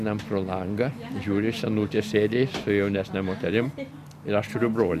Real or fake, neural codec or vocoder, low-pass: real; none; 14.4 kHz